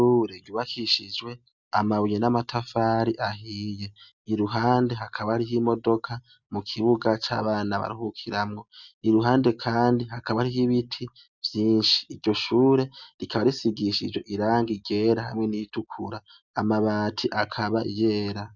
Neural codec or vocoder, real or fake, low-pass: none; real; 7.2 kHz